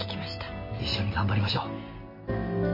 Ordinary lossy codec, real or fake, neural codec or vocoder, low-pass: MP3, 24 kbps; real; none; 5.4 kHz